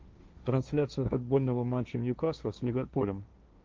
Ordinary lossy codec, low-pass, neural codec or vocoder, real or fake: Opus, 32 kbps; 7.2 kHz; codec, 16 kHz, 1.1 kbps, Voila-Tokenizer; fake